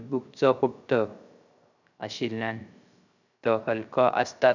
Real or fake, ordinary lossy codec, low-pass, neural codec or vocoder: fake; none; 7.2 kHz; codec, 16 kHz, 0.3 kbps, FocalCodec